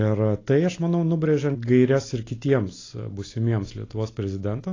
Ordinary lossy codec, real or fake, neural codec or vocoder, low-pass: AAC, 32 kbps; real; none; 7.2 kHz